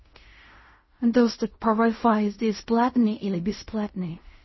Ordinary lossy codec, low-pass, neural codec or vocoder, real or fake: MP3, 24 kbps; 7.2 kHz; codec, 16 kHz in and 24 kHz out, 0.4 kbps, LongCat-Audio-Codec, fine tuned four codebook decoder; fake